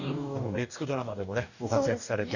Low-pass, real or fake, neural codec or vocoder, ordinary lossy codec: 7.2 kHz; fake; codec, 44.1 kHz, 2.6 kbps, DAC; none